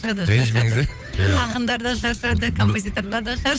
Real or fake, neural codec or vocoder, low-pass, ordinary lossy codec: fake; codec, 16 kHz, 8 kbps, FunCodec, trained on Chinese and English, 25 frames a second; none; none